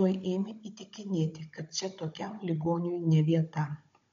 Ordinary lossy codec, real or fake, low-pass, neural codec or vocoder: MP3, 48 kbps; fake; 7.2 kHz; codec, 16 kHz, 16 kbps, FunCodec, trained on Chinese and English, 50 frames a second